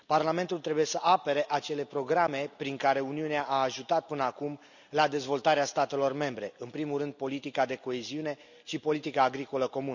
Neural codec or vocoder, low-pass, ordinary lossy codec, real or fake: none; 7.2 kHz; none; real